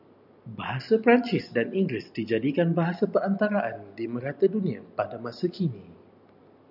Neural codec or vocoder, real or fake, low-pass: none; real; 5.4 kHz